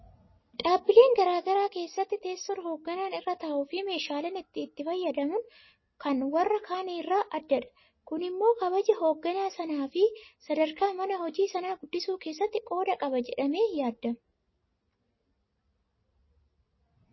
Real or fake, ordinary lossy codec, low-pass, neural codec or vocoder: real; MP3, 24 kbps; 7.2 kHz; none